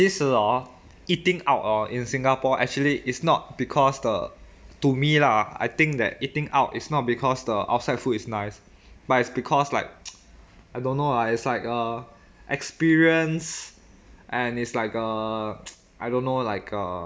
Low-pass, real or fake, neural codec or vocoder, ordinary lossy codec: none; real; none; none